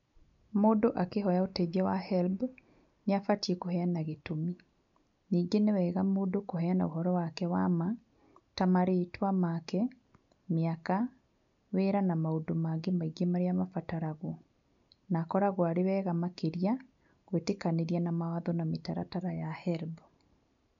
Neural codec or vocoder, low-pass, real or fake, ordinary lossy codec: none; 7.2 kHz; real; none